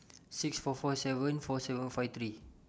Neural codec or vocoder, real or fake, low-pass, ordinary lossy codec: none; real; none; none